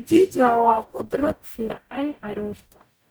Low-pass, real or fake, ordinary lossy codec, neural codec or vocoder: none; fake; none; codec, 44.1 kHz, 0.9 kbps, DAC